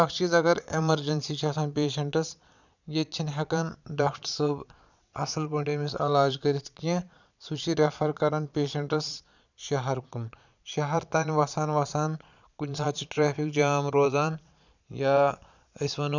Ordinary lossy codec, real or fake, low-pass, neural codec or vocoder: none; fake; 7.2 kHz; vocoder, 22.05 kHz, 80 mel bands, Vocos